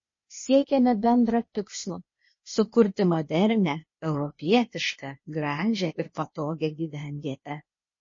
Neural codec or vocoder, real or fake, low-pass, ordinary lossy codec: codec, 16 kHz, 0.8 kbps, ZipCodec; fake; 7.2 kHz; MP3, 32 kbps